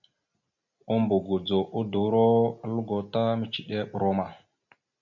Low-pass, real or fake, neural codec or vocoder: 7.2 kHz; real; none